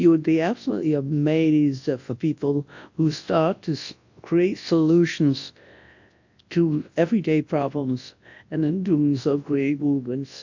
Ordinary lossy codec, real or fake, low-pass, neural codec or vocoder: AAC, 48 kbps; fake; 7.2 kHz; codec, 24 kHz, 0.9 kbps, WavTokenizer, large speech release